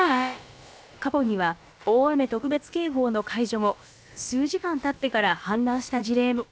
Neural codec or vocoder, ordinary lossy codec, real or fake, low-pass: codec, 16 kHz, about 1 kbps, DyCAST, with the encoder's durations; none; fake; none